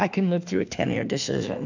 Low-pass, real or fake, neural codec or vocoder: 7.2 kHz; fake; autoencoder, 48 kHz, 32 numbers a frame, DAC-VAE, trained on Japanese speech